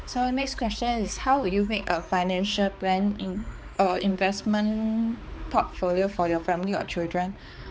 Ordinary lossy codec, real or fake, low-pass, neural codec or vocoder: none; fake; none; codec, 16 kHz, 4 kbps, X-Codec, HuBERT features, trained on balanced general audio